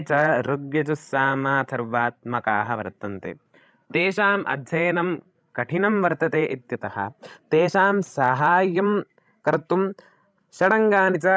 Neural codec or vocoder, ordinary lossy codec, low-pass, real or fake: codec, 16 kHz, 16 kbps, FreqCodec, larger model; none; none; fake